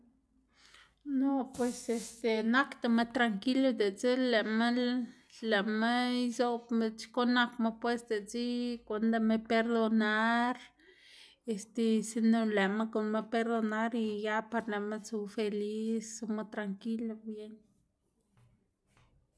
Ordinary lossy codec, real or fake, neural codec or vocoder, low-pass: none; real; none; none